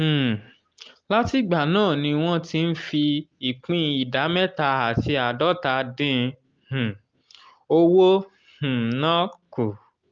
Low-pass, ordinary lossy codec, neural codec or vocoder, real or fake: 7.2 kHz; Opus, 24 kbps; none; real